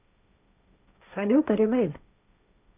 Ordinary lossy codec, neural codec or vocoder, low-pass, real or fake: none; codec, 16 kHz, 1.1 kbps, Voila-Tokenizer; 3.6 kHz; fake